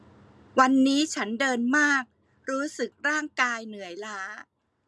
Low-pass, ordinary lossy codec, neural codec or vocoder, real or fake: none; none; none; real